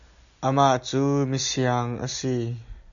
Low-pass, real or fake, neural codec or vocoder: 7.2 kHz; real; none